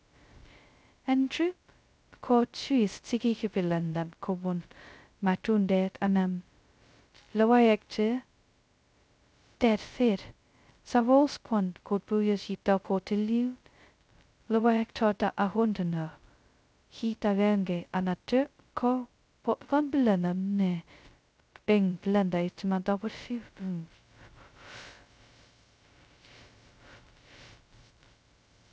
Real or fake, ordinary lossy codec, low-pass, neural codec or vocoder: fake; none; none; codec, 16 kHz, 0.2 kbps, FocalCodec